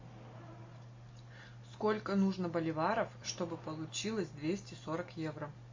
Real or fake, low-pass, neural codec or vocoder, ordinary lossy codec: real; 7.2 kHz; none; MP3, 32 kbps